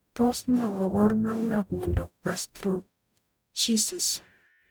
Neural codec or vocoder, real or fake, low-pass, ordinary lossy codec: codec, 44.1 kHz, 0.9 kbps, DAC; fake; none; none